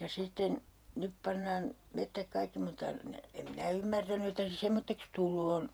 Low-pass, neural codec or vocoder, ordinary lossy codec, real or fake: none; vocoder, 48 kHz, 128 mel bands, Vocos; none; fake